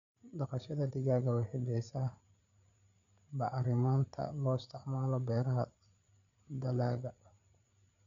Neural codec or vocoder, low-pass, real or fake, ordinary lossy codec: codec, 16 kHz, 8 kbps, FreqCodec, larger model; 7.2 kHz; fake; none